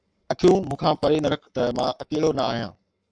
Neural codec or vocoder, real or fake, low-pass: codec, 44.1 kHz, 7.8 kbps, Pupu-Codec; fake; 9.9 kHz